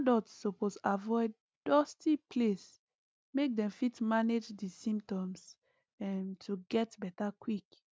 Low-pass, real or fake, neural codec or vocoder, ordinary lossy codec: none; real; none; none